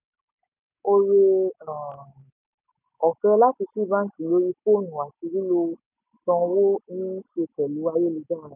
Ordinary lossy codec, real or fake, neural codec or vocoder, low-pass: none; real; none; 3.6 kHz